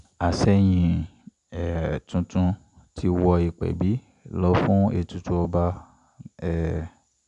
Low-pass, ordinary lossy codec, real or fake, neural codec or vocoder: 10.8 kHz; none; real; none